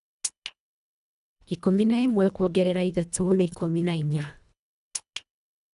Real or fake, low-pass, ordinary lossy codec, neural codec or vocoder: fake; 10.8 kHz; none; codec, 24 kHz, 1.5 kbps, HILCodec